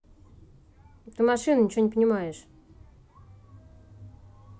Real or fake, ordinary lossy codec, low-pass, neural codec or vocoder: real; none; none; none